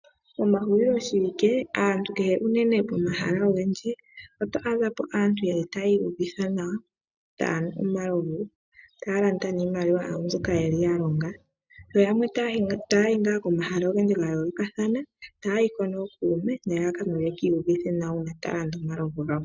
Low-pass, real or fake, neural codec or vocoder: 7.2 kHz; real; none